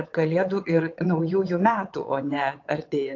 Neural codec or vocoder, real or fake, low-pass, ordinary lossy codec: vocoder, 22.05 kHz, 80 mel bands, WaveNeXt; fake; 7.2 kHz; AAC, 48 kbps